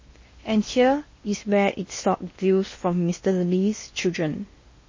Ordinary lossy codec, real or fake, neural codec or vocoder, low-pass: MP3, 32 kbps; fake; codec, 16 kHz in and 24 kHz out, 0.8 kbps, FocalCodec, streaming, 65536 codes; 7.2 kHz